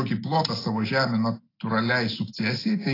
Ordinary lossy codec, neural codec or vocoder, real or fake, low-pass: AAC, 24 kbps; none; real; 5.4 kHz